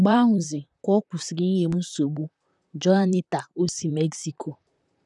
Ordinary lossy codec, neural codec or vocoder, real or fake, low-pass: none; vocoder, 44.1 kHz, 128 mel bands, Pupu-Vocoder; fake; 10.8 kHz